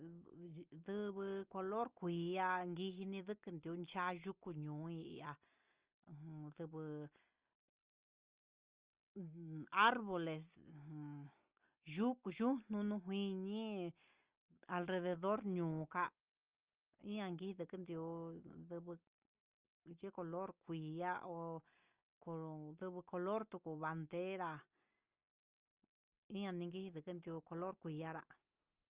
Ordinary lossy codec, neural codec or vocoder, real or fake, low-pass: Opus, 24 kbps; none; real; 3.6 kHz